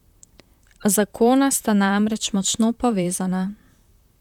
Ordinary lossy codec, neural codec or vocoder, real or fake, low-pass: none; vocoder, 44.1 kHz, 128 mel bands, Pupu-Vocoder; fake; 19.8 kHz